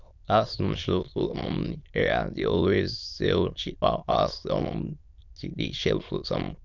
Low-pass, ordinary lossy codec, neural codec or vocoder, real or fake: 7.2 kHz; none; autoencoder, 22.05 kHz, a latent of 192 numbers a frame, VITS, trained on many speakers; fake